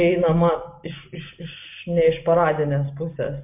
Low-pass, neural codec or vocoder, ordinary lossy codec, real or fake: 3.6 kHz; none; MP3, 32 kbps; real